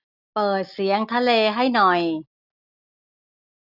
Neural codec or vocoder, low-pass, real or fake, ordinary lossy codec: none; 5.4 kHz; real; none